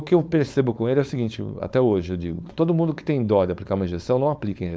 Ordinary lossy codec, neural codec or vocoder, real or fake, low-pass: none; codec, 16 kHz, 4.8 kbps, FACodec; fake; none